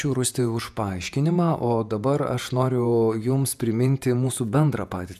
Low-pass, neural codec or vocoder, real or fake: 14.4 kHz; vocoder, 48 kHz, 128 mel bands, Vocos; fake